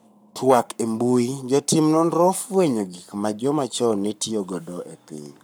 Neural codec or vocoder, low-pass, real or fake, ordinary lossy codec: codec, 44.1 kHz, 7.8 kbps, Pupu-Codec; none; fake; none